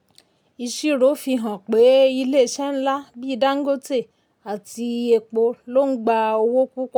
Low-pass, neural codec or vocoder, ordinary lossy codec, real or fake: 19.8 kHz; none; none; real